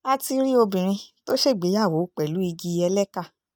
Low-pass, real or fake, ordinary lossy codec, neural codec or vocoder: none; real; none; none